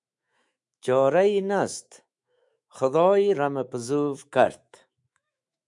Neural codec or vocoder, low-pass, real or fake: autoencoder, 48 kHz, 128 numbers a frame, DAC-VAE, trained on Japanese speech; 10.8 kHz; fake